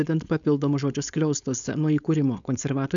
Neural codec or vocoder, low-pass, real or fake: codec, 16 kHz, 4.8 kbps, FACodec; 7.2 kHz; fake